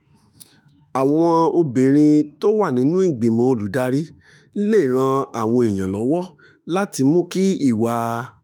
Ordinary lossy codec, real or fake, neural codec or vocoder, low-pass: none; fake; autoencoder, 48 kHz, 32 numbers a frame, DAC-VAE, trained on Japanese speech; 19.8 kHz